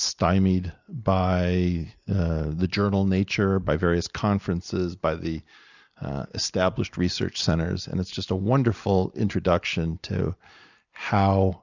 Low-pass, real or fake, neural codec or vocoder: 7.2 kHz; real; none